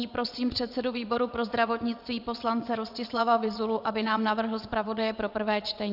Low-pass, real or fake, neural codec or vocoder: 5.4 kHz; fake; vocoder, 22.05 kHz, 80 mel bands, WaveNeXt